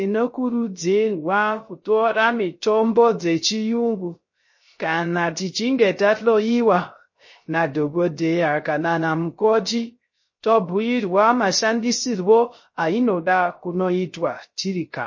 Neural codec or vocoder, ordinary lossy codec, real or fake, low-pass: codec, 16 kHz, 0.3 kbps, FocalCodec; MP3, 32 kbps; fake; 7.2 kHz